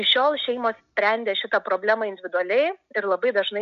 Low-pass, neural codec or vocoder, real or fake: 7.2 kHz; none; real